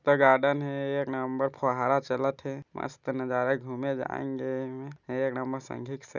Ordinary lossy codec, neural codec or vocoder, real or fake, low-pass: none; none; real; 7.2 kHz